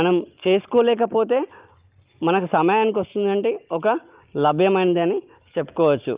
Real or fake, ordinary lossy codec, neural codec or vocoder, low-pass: real; Opus, 32 kbps; none; 3.6 kHz